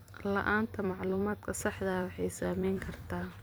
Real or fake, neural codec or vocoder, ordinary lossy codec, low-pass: fake; vocoder, 44.1 kHz, 128 mel bands every 256 samples, BigVGAN v2; none; none